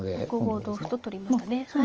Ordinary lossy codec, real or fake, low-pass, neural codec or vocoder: Opus, 24 kbps; real; 7.2 kHz; none